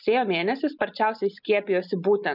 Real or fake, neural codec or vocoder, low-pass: real; none; 5.4 kHz